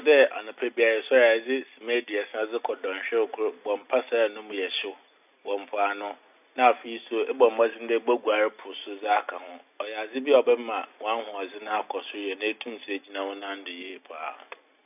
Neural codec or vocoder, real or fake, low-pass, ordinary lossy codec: none; real; 3.6 kHz; none